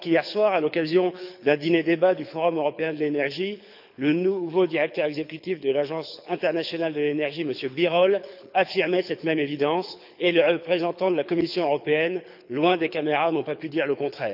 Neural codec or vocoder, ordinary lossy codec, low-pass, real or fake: codec, 24 kHz, 6 kbps, HILCodec; none; 5.4 kHz; fake